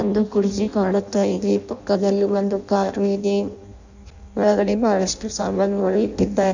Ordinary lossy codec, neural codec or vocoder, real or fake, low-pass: none; codec, 16 kHz in and 24 kHz out, 0.6 kbps, FireRedTTS-2 codec; fake; 7.2 kHz